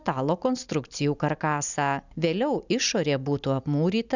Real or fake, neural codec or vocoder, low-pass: real; none; 7.2 kHz